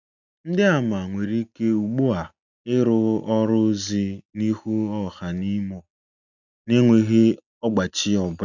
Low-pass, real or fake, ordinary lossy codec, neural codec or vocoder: 7.2 kHz; real; none; none